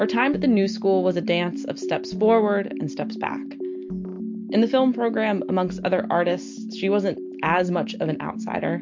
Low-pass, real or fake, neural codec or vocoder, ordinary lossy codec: 7.2 kHz; real; none; MP3, 48 kbps